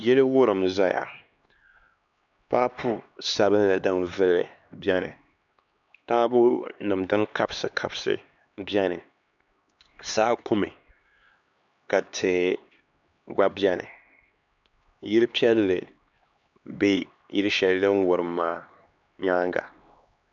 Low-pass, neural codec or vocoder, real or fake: 7.2 kHz; codec, 16 kHz, 2 kbps, X-Codec, HuBERT features, trained on LibriSpeech; fake